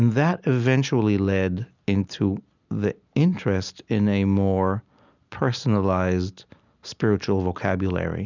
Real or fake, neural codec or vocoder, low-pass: real; none; 7.2 kHz